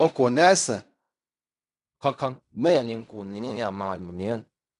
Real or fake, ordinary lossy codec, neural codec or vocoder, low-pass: fake; none; codec, 16 kHz in and 24 kHz out, 0.4 kbps, LongCat-Audio-Codec, fine tuned four codebook decoder; 10.8 kHz